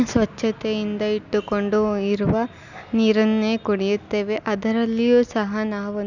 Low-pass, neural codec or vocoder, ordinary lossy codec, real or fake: 7.2 kHz; none; none; real